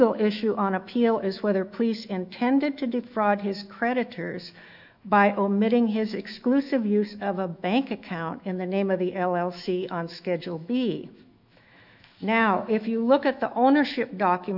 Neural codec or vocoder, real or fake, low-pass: autoencoder, 48 kHz, 128 numbers a frame, DAC-VAE, trained on Japanese speech; fake; 5.4 kHz